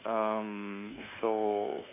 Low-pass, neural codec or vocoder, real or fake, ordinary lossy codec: 3.6 kHz; codec, 24 kHz, 1.2 kbps, DualCodec; fake; none